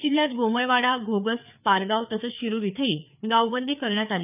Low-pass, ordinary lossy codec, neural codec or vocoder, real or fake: 3.6 kHz; none; codec, 16 kHz, 4 kbps, FreqCodec, larger model; fake